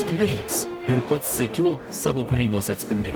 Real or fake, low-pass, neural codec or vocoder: fake; 19.8 kHz; codec, 44.1 kHz, 0.9 kbps, DAC